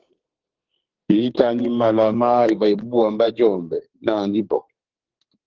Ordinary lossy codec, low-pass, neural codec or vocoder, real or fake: Opus, 16 kbps; 7.2 kHz; codec, 44.1 kHz, 2.6 kbps, SNAC; fake